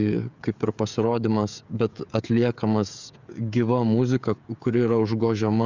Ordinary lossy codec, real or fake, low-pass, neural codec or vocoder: Opus, 64 kbps; fake; 7.2 kHz; codec, 16 kHz, 16 kbps, FreqCodec, smaller model